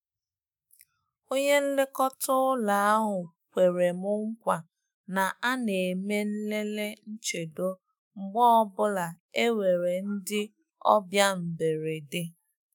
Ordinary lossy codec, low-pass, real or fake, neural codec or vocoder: none; none; fake; autoencoder, 48 kHz, 128 numbers a frame, DAC-VAE, trained on Japanese speech